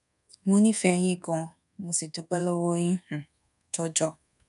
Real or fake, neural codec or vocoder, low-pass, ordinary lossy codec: fake; codec, 24 kHz, 1.2 kbps, DualCodec; 10.8 kHz; none